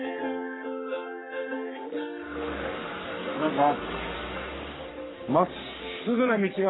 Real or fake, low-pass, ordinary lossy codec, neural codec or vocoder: fake; 7.2 kHz; AAC, 16 kbps; codec, 44.1 kHz, 3.4 kbps, Pupu-Codec